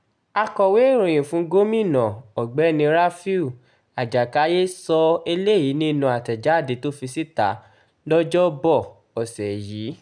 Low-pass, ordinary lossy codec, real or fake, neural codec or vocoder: 9.9 kHz; none; real; none